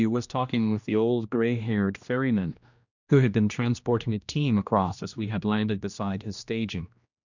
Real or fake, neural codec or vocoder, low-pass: fake; codec, 16 kHz, 1 kbps, X-Codec, HuBERT features, trained on general audio; 7.2 kHz